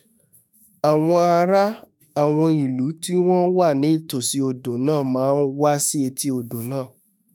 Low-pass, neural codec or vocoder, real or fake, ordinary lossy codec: none; autoencoder, 48 kHz, 32 numbers a frame, DAC-VAE, trained on Japanese speech; fake; none